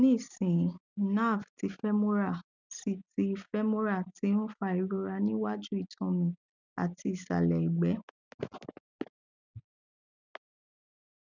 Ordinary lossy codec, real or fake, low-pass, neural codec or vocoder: none; real; 7.2 kHz; none